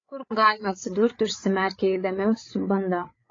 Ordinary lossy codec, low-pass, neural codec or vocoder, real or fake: AAC, 32 kbps; 7.2 kHz; codec, 16 kHz, 8 kbps, FreqCodec, larger model; fake